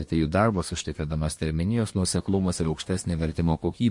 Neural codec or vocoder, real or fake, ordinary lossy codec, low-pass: codec, 24 kHz, 1 kbps, SNAC; fake; MP3, 48 kbps; 10.8 kHz